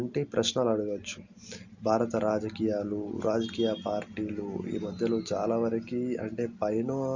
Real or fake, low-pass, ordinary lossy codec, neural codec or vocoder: real; 7.2 kHz; Opus, 64 kbps; none